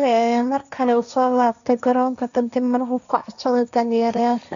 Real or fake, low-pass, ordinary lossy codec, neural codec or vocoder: fake; 7.2 kHz; MP3, 96 kbps; codec, 16 kHz, 1.1 kbps, Voila-Tokenizer